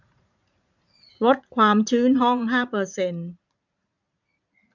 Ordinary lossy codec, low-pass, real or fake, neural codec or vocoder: none; 7.2 kHz; fake; vocoder, 44.1 kHz, 80 mel bands, Vocos